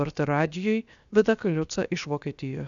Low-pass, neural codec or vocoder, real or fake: 7.2 kHz; codec, 16 kHz, 0.7 kbps, FocalCodec; fake